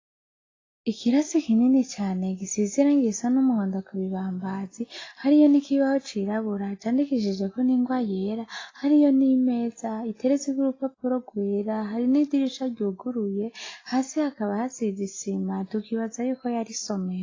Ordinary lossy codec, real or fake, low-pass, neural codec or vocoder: AAC, 32 kbps; real; 7.2 kHz; none